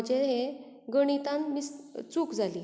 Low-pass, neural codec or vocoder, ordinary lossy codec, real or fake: none; none; none; real